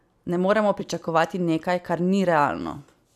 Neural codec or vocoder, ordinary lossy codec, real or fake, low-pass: vocoder, 44.1 kHz, 128 mel bands every 256 samples, BigVGAN v2; none; fake; 14.4 kHz